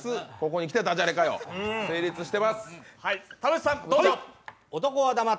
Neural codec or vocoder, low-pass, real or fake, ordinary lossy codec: none; none; real; none